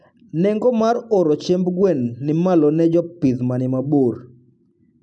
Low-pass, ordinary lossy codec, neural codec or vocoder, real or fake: 10.8 kHz; none; none; real